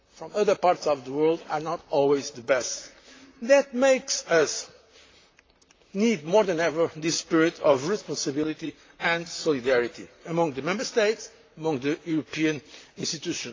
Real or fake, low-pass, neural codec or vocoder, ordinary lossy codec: fake; 7.2 kHz; vocoder, 44.1 kHz, 128 mel bands, Pupu-Vocoder; AAC, 32 kbps